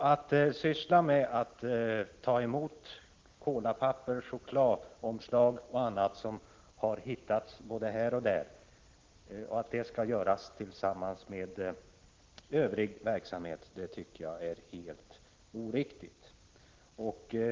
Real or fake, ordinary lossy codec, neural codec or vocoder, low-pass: real; Opus, 16 kbps; none; 7.2 kHz